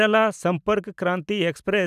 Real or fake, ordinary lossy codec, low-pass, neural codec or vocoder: real; none; 14.4 kHz; none